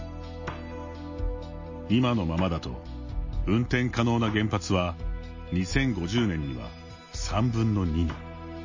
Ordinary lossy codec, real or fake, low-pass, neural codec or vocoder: MP3, 32 kbps; real; 7.2 kHz; none